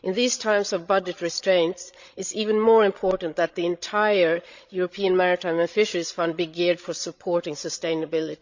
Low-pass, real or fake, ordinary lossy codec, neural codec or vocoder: 7.2 kHz; fake; Opus, 64 kbps; codec, 16 kHz, 16 kbps, FreqCodec, larger model